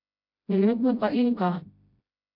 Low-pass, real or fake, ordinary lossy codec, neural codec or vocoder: 5.4 kHz; fake; none; codec, 16 kHz, 0.5 kbps, FreqCodec, smaller model